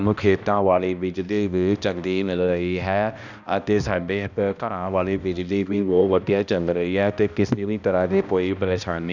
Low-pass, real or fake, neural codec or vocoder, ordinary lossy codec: 7.2 kHz; fake; codec, 16 kHz, 1 kbps, X-Codec, HuBERT features, trained on balanced general audio; none